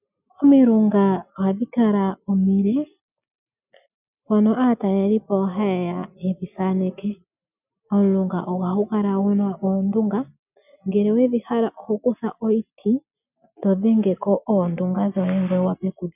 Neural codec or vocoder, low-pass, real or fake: none; 3.6 kHz; real